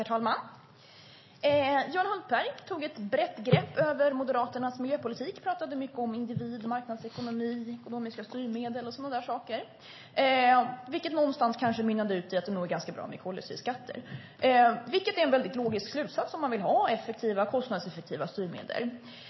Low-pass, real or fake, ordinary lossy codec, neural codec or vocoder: 7.2 kHz; real; MP3, 24 kbps; none